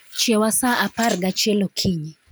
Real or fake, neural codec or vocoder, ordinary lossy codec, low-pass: real; none; none; none